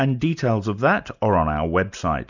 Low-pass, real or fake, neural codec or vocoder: 7.2 kHz; real; none